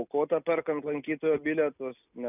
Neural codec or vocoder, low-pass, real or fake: none; 3.6 kHz; real